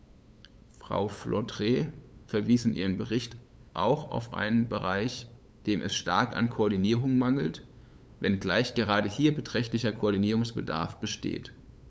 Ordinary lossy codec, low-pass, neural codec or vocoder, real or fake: none; none; codec, 16 kHz, 8 kbps, FunCodec, trained on LibriTTS, 25 frames a second; fake